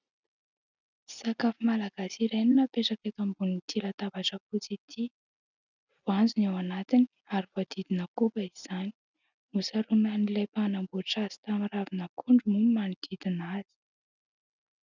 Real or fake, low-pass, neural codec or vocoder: real; 7.2 kHz; none